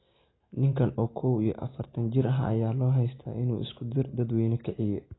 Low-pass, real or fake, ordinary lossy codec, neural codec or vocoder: 7.2 kHz; real; AAC, 16 kbps; none